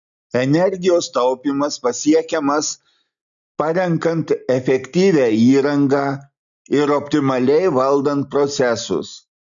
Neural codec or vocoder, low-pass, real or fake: none; 7.2 kHz; real